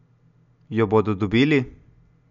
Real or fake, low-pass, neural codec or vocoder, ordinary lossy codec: real; 7.2 kHz; none; none